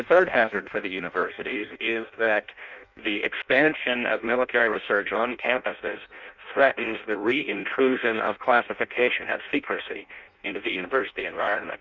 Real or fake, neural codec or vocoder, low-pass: fake; codec, 16 kHz in and 24 kHz out, 0.6 kbps, FireRedTTS-2 codec; 7.2 kHz